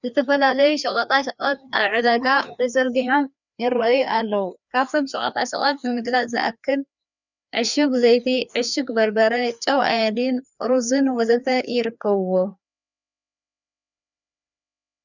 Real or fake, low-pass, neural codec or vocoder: fake; 7.2 kHz; codec, 16 kHz, 2 kbps, FreqCodec, larger model